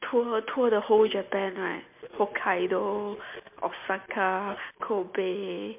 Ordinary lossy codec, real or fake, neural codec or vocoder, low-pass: MP3, 32 kbps; real; none; 3.6 kHz